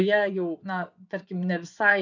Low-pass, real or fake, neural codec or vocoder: 7.2 kHz; fake; vocoder, 24 kHz, 100 mel bands, Vocos